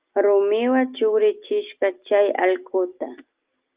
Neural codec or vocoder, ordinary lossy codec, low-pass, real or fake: none; Opus, 32 kbps; 3.6 kHz; real